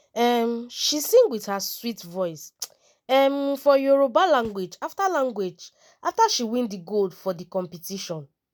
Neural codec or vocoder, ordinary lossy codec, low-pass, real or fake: none; none; none; real